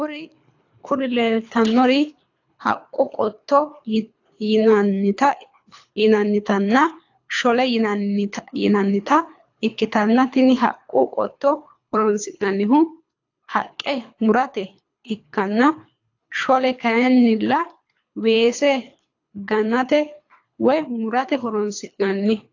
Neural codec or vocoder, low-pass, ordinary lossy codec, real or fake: codec, 24 kHz, 3 kbps, HILCodec; 7.2 kHz; AAC, 48 kbps; fake